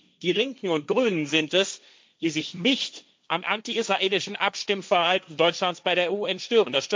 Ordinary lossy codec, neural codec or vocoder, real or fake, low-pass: none; codec, 16 kHz, 1.1 kbps, Voila-Tokenizer; fake; none